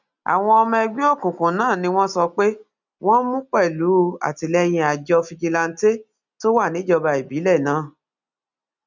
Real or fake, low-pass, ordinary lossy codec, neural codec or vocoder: real; 7.2 kHz; none; none